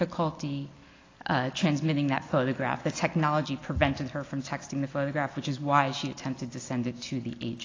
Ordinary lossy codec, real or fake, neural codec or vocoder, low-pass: AAC, 32 kbps; real; none; 7.2 kHz